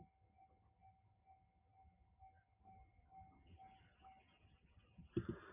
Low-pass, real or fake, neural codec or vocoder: 3.6 kHz; real; none